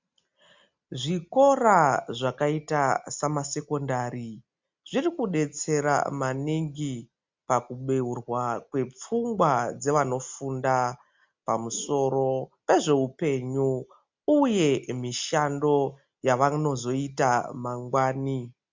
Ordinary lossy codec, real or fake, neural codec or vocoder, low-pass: MP3, 64 kbps; real; none; 7.2 kHz